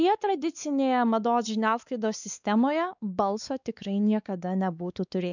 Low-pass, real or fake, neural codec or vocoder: 7.2 kHz; fake; codec, 16 kHz, 4 kbps, X-Codec, WavLM features, trained on Multilingual LibriSpeech